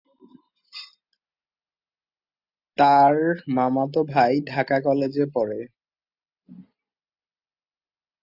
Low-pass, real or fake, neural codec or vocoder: 5.4 kHz; real; none